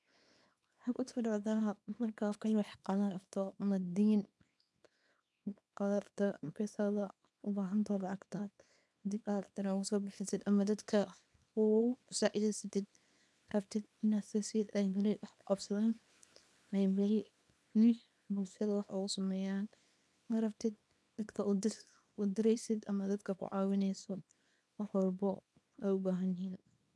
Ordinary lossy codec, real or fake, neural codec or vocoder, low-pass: none; fake; codec, 24 kHz, 0.9 kbps, WavTokenizer, small release; none